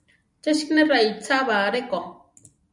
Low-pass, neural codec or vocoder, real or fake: 10.8 kHz; none; real